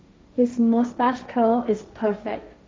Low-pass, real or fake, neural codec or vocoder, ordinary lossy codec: none; fake; codec, 16 kHz, 1.1 kbps, Voila-Tokenizer; none